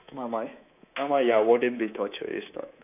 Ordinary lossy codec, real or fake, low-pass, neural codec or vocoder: none; fake; 3.6 kHz; codec, 24 kHz, 3.1 kbps, DualCodec